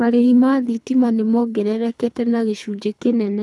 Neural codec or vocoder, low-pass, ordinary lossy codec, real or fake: codec, 24 kHz, 3 kbps, HILCodec; none; none; fake